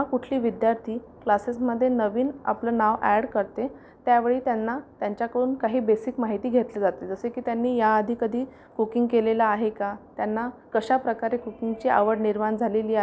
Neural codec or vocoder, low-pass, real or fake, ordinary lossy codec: none; none; real; none